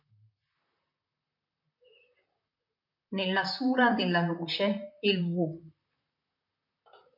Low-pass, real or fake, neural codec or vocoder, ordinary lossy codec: 5.4 kHz; fake; vocoder, 44.1 kHz, 128 mel bands, Pupu-Vocoder; MP3, 48 kbps